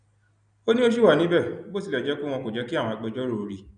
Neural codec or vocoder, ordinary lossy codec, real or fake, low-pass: none; none; real; 9.9 kHz